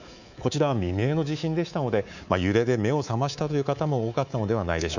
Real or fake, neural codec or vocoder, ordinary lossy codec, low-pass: fake; codec, 24 kHz, 3.1 kbps, DualCodec; none; 7.2 kHz